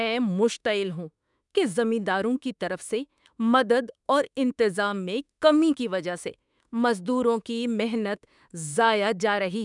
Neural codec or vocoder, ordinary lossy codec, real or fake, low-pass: autoencoder, 48 kHz, 32 numbers a frame, DAC-VAE, trained on Japanese speech; none; fake; 10.8 kHz